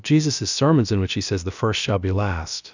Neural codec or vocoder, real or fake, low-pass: codec, 16 kHz, about 1 kbps, DyCAST, with the encoder's durations; fake; 7.2 kHz